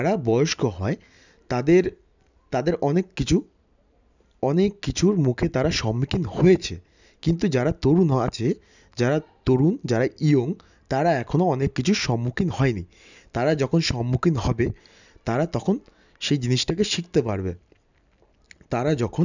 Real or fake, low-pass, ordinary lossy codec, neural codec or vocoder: real; 7.2 kHz; none; none